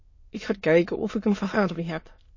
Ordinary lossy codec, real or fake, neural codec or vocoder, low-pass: MP3, 32 kbps; fake; autoencoder, 22.05 kHz, a latent of 192 numbers a frame, VITS, trained on many speakers; 7.2 kHz